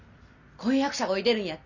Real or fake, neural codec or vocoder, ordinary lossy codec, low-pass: real; none; none; 7.2 kHz